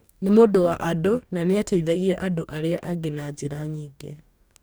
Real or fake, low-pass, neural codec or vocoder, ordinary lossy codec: fake; none; codec, 44.1 kHz, 2.6 kbps, DAC; none